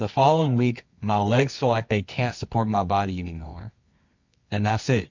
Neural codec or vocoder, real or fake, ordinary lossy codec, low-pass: codec, 24 kHz, 0.9 kbps, WavTokenizer, medium music audio release; fake; MP3, 48 kbps; 7.2 kHz